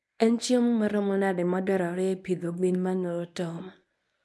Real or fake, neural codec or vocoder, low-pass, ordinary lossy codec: fake; codec, 24 kHz, 0.9 kbps, WavTokenizer, medium speech release version 1; none; none